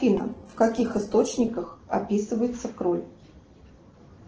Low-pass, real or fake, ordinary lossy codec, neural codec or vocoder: 7.2 kHz; real; Opus, 16 kbps; none